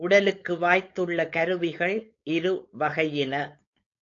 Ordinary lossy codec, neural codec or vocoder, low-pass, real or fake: AAC, 48 kbps; codec, 16 kHz, 4.8 kbps, FACodec; 7.2 kHz; fake